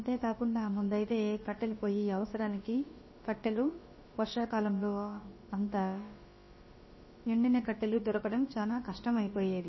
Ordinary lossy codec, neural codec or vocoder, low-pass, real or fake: MP3, 24 kbps; codec, 16 kHz, about 1 kbps, DyCAST, with the encoder's durations; 7.2 kHz; fake